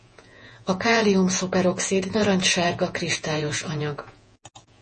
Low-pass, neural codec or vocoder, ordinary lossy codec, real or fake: 10.8 kHz; vocoder, 48 kHz, 128 mel bands, Vocos; MP3, 32 kbps; fake